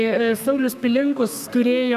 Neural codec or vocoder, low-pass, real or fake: codec, 32 kHz, 1.9 kbps, SNAC; 14.4 kHz; fake